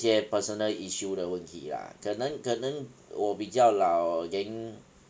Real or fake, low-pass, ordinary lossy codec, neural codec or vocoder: real; none; none; none